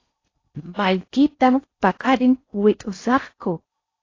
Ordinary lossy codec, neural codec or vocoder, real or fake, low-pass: AAC, 32 kbps; codec, 16 kHz in and 24 kHz out, 0.6 kbps, FocalCodec, streaming, 4096 codes; fake; 7.2 kHz